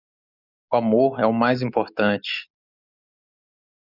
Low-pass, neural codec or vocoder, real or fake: 5.4 kHz; none; real